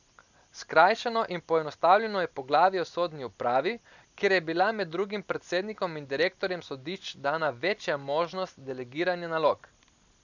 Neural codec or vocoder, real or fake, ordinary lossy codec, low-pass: none; real; none; 7.2 kHz